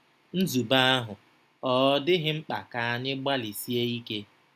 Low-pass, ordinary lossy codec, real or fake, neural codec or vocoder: 14.4 kHz; none; real; none